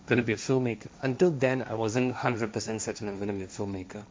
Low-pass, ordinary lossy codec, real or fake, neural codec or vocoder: none; none; fake; codec, 16 kHz, 1.1 kbps, Voila-Tokenizer